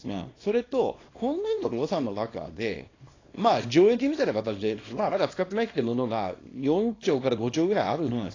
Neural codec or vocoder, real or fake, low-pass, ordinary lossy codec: codec, 24 kHz, 0.9 kbps, WavTokenizer, small release; fake; 7.2 kHz; AAC, 32 kbps